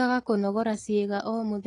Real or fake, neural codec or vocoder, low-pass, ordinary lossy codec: real; none; 10.8 kHz; AAC, 32 kbps